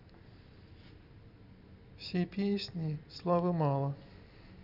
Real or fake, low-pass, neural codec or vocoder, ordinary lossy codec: real; 5.4 kHz; none; none